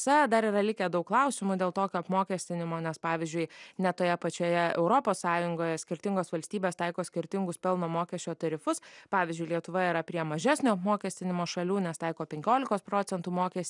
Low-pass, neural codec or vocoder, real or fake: 10.8 kHz; none; real